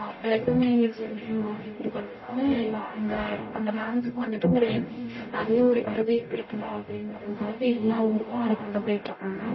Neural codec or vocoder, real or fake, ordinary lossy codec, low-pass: codec, 44.1 kHz, 0.9 kbps, DAC; fake; MP3, 24 kbps; 7.2 kHz